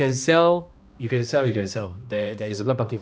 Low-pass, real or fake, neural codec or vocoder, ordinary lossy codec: none; fake; codec, 16 kHz, 1 kbps, X-Codec, HuBERT features, trained on balanced general audio; none